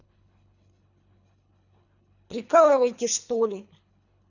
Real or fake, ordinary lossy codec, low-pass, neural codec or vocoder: fake; none; 7.2 kHz; codec, 24 kHz, 3 kbps, HILCodec